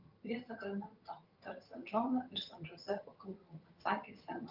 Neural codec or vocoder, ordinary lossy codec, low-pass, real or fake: vocoder, 22.05 kHz, 80 mel bands, HiFi-GAN; Opus, 24 kbps; 5.4 kHz; fake